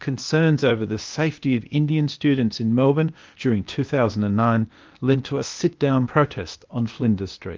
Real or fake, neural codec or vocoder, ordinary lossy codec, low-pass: fake; codec, 16 kHz, 0.8 kbps, ZipCodec; Opus, 24 kbps; 7.2 kHz